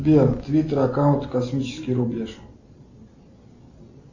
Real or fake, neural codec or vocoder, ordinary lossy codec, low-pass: real; none; Opus, 64 kbps; 7.2 kHz